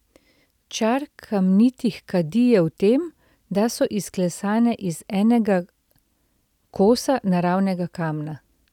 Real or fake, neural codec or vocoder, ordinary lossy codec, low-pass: real; none; none; 19.8 kHz